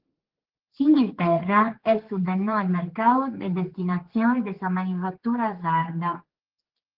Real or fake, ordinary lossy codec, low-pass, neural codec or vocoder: fake; Opus, 16 kbps; 5.4 kHz; codec, 16 kHz, 4 kbps, X-Codec, HuBERT features, trained on general audio